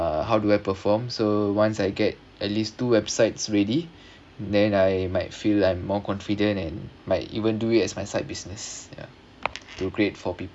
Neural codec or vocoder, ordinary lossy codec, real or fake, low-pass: none; none; real; none